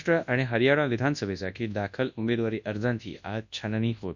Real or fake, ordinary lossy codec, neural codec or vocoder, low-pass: fake; none; codec, 24 kHz, 0.9 kbps, WavTokenizer, large speech release; 7.2 kHz